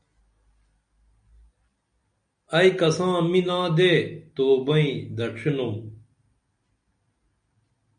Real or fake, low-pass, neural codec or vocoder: real; 9.9 kHz; none